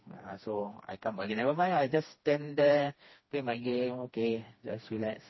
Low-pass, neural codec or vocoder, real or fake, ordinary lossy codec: 7.2 kHz; codec, 16 kHz, 2 kbps, FreqCodec, smaller model; fake; MP3, 24 kbps